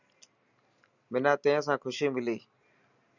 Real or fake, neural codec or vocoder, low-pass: real; none; 7.2 kHz